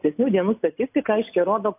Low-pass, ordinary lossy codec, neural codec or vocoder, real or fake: 3.6 kHz; AAC, 32 kbps; none; real